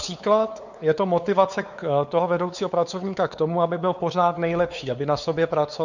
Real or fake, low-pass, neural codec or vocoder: fake; 7.2 kHz; codec, 16 kHz, 4 kbps, X-Codec, WavLM features, trained on Multilingual LibriSpeech